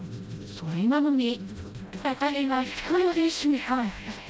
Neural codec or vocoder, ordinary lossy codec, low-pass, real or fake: codec, 16 kHz, 0.5 kbps, FreqCodec, smaller model; none; none; fake